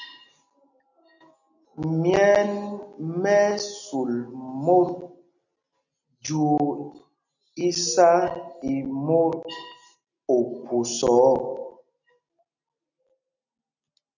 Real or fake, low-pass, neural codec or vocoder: real; 7.2 kHz; none